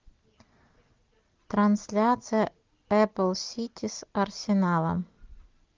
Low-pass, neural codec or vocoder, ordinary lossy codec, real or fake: 7.2 kHz; none; Opus, 32 kbps; real